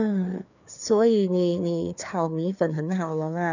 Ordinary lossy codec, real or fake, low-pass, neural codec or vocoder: none; fake; 7.2 kHz; codec, 16 kHz, 2 kbps, FreqCodec, larger model